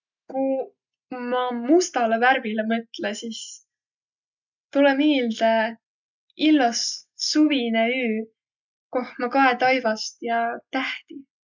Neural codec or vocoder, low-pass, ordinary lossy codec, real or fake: none; 7.2 kHz; none; real